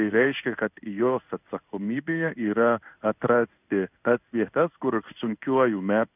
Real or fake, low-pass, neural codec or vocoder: fake; 3.6 kHz; codec, 16 kHz in and 24 kHz out, 1 kbps, XY-Tokenizer